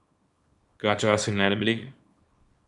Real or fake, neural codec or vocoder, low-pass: fake; codec, 24 kHz, 0.9 kbps, WavTokenizer, small release; 10.8 kHz